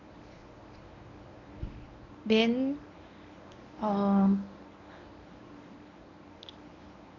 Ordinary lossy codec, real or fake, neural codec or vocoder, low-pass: none; fake; codec, 24 kHz, 0.9 kbps, WavTokenizer, medium speech release version 1; 7.2 kHz